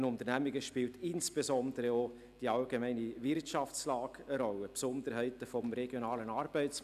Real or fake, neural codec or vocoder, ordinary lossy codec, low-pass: real; none; none; 14.4 kHz